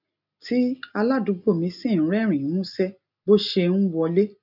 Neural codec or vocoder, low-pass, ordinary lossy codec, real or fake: none; 5.4 kHz; none; real